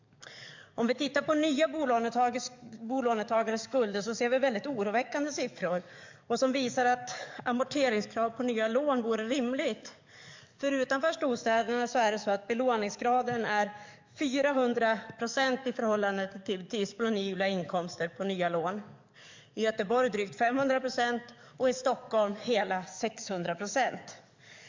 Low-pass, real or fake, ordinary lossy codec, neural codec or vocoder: 7.2 kHz; fake; MP3, 64 kbps; codec, 44.1 kHz, 7.8 kbps, DAC